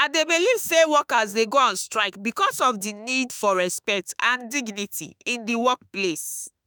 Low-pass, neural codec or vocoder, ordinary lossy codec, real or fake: none; autoencoder, 48 kHz, 32 numbers a frame, DAC-VAE, trained on Japanese speech; none; fake